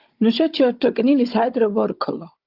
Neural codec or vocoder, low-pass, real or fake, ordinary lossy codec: codec, 16 kHz, 16 kbps, FunCodec, trained on Chinese and English, 50 frames a second; 5.4 kHz; fake; Opus, 24 kbps